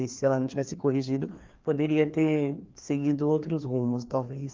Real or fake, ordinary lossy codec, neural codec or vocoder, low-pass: fake; Opus, 32 kbps; codec, 16 kHz, 2 kbps, FreqCodec, larger model; 7.2 kHz